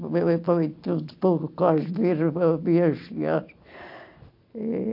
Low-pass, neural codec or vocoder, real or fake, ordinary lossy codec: 5.4 kHz; none; real; MP3, 32 kbps